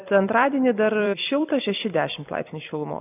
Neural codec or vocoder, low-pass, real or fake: vocoder, 44.1 kHz, 128 mel bands every 256 samples, BigVGAN v2; 3.6 kHz; fake